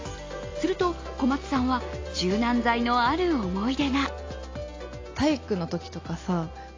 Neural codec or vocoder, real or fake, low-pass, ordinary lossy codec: none; real; 7.2 kHz; AAC, 32 kbps